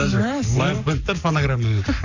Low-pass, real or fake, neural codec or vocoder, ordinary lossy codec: 7.2 kHz; fake; codec, 44.1 kHz, 7.8 kbps, Pupu-Codec; none